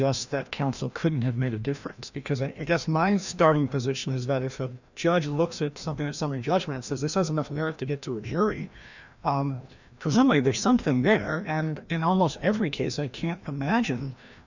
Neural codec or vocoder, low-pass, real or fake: codec, 16 kHz, 1 kbps, FreqCodec, larger model; 7.2 kHz; fake